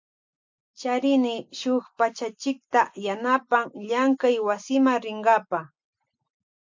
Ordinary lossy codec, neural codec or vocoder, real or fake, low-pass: MP3, 64 kbps; none; real; 7.2 kHz